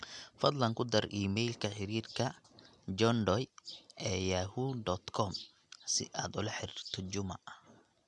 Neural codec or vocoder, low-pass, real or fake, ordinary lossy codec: none; 10.8 kHz; real; none